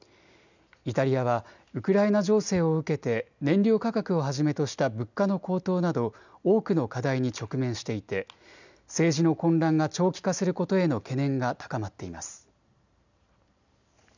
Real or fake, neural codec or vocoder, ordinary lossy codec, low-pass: real; none; none; 7.2 kHz